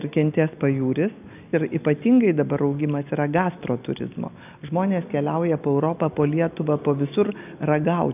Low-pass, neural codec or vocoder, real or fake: 3.6 kHz; none; real